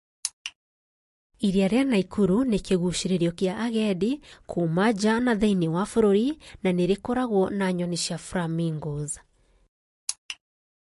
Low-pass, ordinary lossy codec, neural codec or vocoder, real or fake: 14.4 kHz; MP3, 48 kbps; none; real